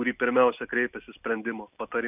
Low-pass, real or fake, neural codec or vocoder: 3.6 kHz; real; none